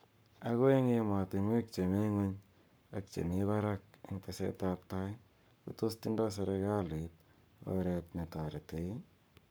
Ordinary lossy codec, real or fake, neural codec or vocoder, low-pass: none; fake; codec, 44.1 kHz, 7.8 kbps, Pupu-Codec; none